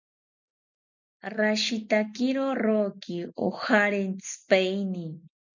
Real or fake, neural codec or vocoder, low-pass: real; none; 7.2 kHz